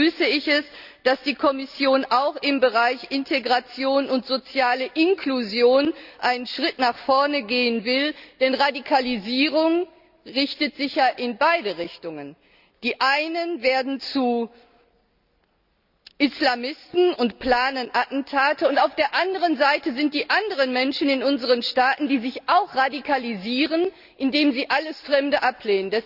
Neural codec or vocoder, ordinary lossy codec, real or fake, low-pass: none; Opus, 64 kbps; real; 5.4 kHz